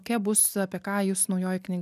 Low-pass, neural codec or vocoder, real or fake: 14.4 kHz; none; real